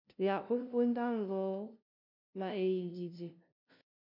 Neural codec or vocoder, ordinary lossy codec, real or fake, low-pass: codec, 16 kHz, 0.5 kbps, FunCodec, trained on LibriTTS, 25 frames a second; MP3, 48 kbps; fake; 5.4 kHz